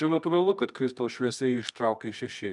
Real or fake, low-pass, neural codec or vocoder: fake; 10.8 kHz; codec, 24 kHz, 0.9 kbps, WavTokenizer, medium music audio release